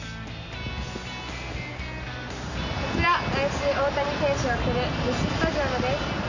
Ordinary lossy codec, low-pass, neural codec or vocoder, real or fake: none; 7.2 kHz; none; real